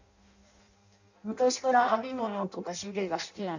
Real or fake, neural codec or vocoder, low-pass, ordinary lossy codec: fake; codec, 16 kHz in and 24 kHz out, 0.6 kbps, FireRedTTS-2 codec; 7.2 kHz; none